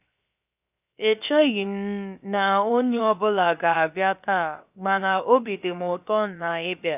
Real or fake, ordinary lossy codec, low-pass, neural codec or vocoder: fake; none; 3.6 kHz; codec, 16 kHz, 0.7 kbps, FocalCodec